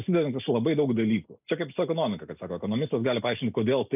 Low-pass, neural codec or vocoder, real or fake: 3.6 kHz; none; real